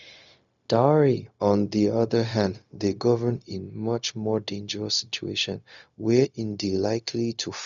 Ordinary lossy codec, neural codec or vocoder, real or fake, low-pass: none; codec, 16 kHz, 0.4 kbps, LongCat-Audio-Codec; fake; 7.2 kHz